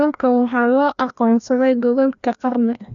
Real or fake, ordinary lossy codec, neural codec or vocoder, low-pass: fake; none; codec, 16 kHz, 1 kbps, FreqCodec, larger model; 7.2 kHz